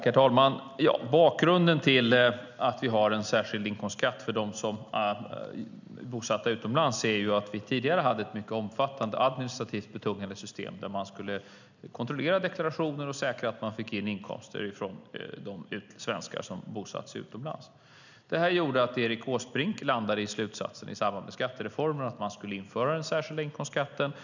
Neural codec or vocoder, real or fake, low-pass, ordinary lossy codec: none; real; 7.2 kHz; none